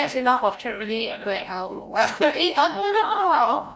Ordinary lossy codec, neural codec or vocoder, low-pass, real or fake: none; codec, 16 kHz, 0.5 kbps, FreqCodec, larger model; none; fake